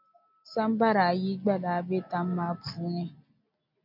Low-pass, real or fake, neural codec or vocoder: 5.4 kHz; real; none